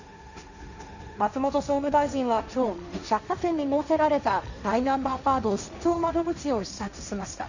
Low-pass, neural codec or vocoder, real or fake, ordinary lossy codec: 7.2 kHz; codec, 16 kHz, 1.1 kbps, Voila-Tokenizer; fake; none